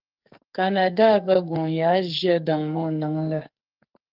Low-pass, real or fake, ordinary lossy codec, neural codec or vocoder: 5.4 kHz; fake; Opus, 24 kbps; codec, 44.1 kHz, 2.6 kbps, SNAC